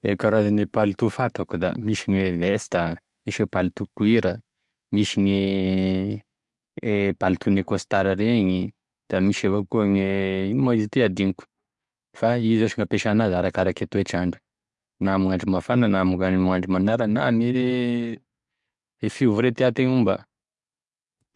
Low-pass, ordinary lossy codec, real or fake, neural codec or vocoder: 10.8 kHz; MP3, 64 kbps; fake; codec, 44.1 kHz, 7.8 kbps, DAC